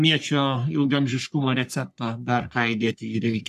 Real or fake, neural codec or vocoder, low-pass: fake; codec, 44.1 kHz, 3.4 kbps, Pupu-Codec; 14.4 kHz